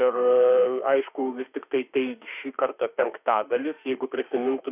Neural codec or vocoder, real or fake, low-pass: autoencoder, 48 kHz, 32 numbers a frame, DAC-VAE, trained on Japanese speech; fake; 3.6 kHz